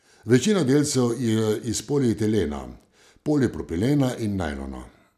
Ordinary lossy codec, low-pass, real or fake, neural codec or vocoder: none; 14.4 kHz; real; none